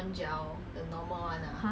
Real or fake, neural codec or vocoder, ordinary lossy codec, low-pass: real; none; none; none